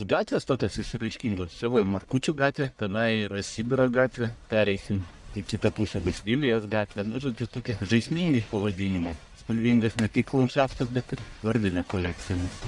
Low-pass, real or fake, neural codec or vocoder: 10.8 kHz; fake; codec, 44.1 kHz, 1.7 kbps, Pupu-Codec